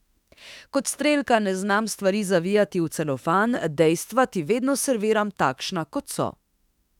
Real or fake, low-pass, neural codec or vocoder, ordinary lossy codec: fake; 19.8 kHz; autoencoder, 48 kHz, 32 numbers a frame, DAC-VAE, trained on Japanese speech; none